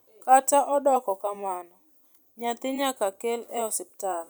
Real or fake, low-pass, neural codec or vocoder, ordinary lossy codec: fake; none; vocoder, 44.1 kHz, 128 mel bands every 512 samples, BigVGAN v2; none